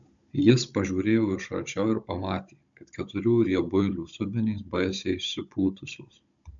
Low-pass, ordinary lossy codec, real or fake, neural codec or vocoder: 7.2 kHz; MP3, 64 kbps; fake; codec, 16 kHz, 16 kbps, FunCodec, trained on Chinese and English, 50 frames a second